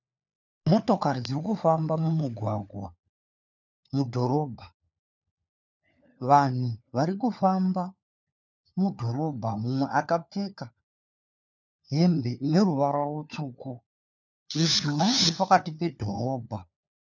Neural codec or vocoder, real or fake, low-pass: codec, 16 kHz, 4 kbps, FunCodec, trained on LibriTTS, 50 frames a second; fake; 7.2 kHz